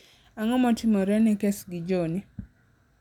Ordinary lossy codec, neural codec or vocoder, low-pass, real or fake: none; none; 19.8 kHz; real